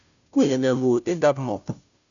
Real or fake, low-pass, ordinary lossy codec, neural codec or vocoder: fake; 7.2 kHz; MP3, 96 kbps; codec, 16 kHz, 0.5 kbps, FunCodec, trained on Chinese and English, 25 frames a second